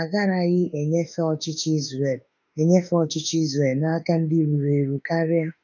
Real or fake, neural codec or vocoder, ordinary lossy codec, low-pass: fake; autoencoder, 48 kHz, 32 numbers a frame, DAC-VAE, trained on Japanese speech; none; 7.2 kHz